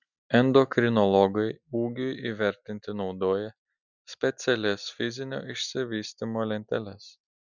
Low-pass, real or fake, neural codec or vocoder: 7.2 kHz; real; none